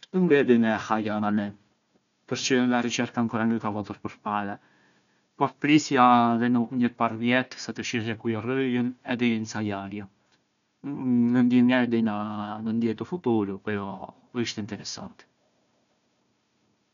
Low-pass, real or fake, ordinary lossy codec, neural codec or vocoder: 7.2 kHz; fake; none; codec, 16 kHz, 1 kbps, FunCodec, trained on Chinese and English, 50 frames a second